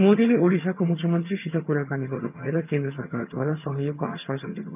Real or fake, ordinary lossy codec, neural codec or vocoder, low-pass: fake; none; vocoder, 22.05 kHz, 80 mel bands, HiFi-GAN; 3.6 kHz